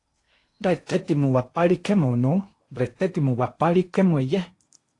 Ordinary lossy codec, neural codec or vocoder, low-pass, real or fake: AAC, 48 kbps; codec, 16 kHz in and 24 kHz out, 0.8 kbps, FocalCodec, streaming, 65536 codes; 10.8 kHz; fake